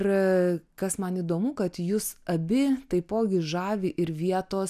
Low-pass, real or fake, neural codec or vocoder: 14.4 kHz; real; none